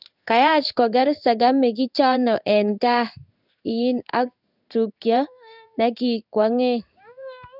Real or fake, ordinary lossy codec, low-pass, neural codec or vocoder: fake; none; 5.4 kHz; codec, 16 kHz in and 24 kHz out, 1 kbps, XY-Tokenizer